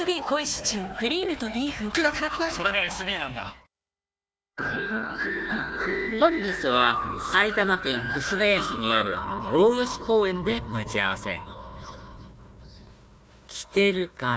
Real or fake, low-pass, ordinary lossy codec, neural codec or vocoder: fake; none; none; codec, 16 kHz, 1 kbps, FunCodec, trained on Chinese and English, 50 frames a second